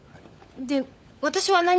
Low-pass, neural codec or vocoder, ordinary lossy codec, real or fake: none; codec, 16 kHz, 16 kbps, FunCodec, trained on LibriTTS, 50 frames a second; none; fake